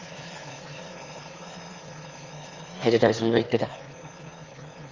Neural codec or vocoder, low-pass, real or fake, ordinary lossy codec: autoencoder, 22.05 kHz, a latent of 192 numbers a frame, VITS, trained on one speaker; 7.2 kHz; fake; Opus, 32 kbps